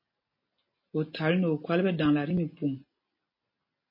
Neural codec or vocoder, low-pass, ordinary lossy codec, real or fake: none; 5.4 kHz; MP3, 24 kbps; real